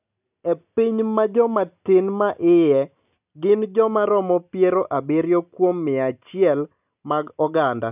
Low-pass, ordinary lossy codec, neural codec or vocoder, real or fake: 3.6 kHz; none; none; real